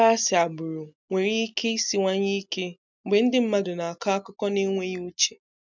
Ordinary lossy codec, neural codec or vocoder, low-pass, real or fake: none; none; 7.2 kHz; real